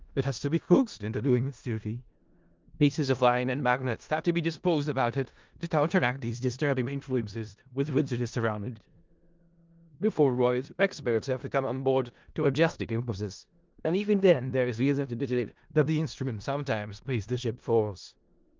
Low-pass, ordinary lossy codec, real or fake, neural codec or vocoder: 7.2 kHz; Opus, 32 kbps; fake; codec, 16 kHz in and 24 kHz out, 0.4 kbps, LongCat-Audio-Codec, four codebook decoder